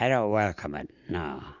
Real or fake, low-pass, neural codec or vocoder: real; 7.2 kHz; none